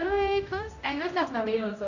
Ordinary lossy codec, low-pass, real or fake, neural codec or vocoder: none; 7.2 kHz; fake; codec, 16 kHz, 0.5 kbps, X-Codec, HuBERT features, trained on general audio